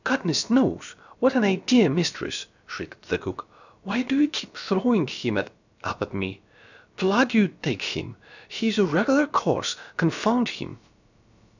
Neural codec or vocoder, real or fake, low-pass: codec, 16 kHz, about 1 kbps, DyCAST, with the encoder's durations; fake; 7.2 kHz